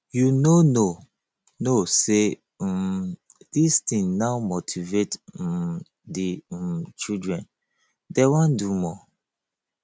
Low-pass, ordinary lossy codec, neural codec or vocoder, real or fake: none; none; none; real